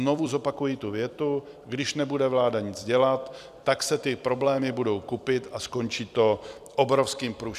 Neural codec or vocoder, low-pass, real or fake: vocoder, 44.1 kHz, 128 mel bands every 256 samples, BigVGAN v2; 14.4 kHz; fake